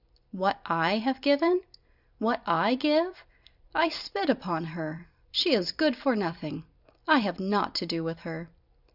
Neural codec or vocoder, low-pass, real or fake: vocoder, 44.1 kHz, 128 mel bands every 256 samples, BigVGAN v2; 5.4 kHz; fake